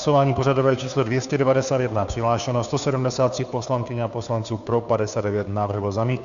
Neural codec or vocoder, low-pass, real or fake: codec, 16 kHz, 2 kbps, FunCodec, trained on Chinese and English, 25 frames a second; 7.2 kHz; fake